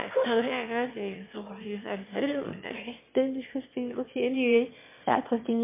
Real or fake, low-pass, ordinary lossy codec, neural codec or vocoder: fake; 3.6 kHz; MP3, 24 kbps; autoencoder, 22.05 kHz, a latent of 192 numbers a frame, VITS, trained on one speaker